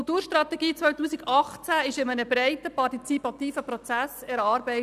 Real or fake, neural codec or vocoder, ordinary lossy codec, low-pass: real; none; none; 14.4 kHz